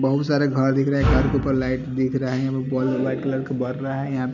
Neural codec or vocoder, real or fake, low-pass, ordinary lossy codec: none; real; 7.2 kHz; none